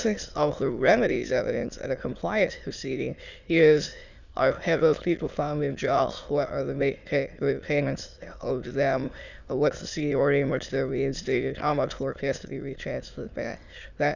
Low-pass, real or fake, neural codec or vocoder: 7.2 kHz; fake; autoencoder, 22.05 kHz, a latent of 192 numbers a frame, VITS, trained on many speakers